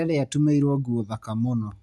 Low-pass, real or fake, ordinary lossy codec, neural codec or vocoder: none; real; none; none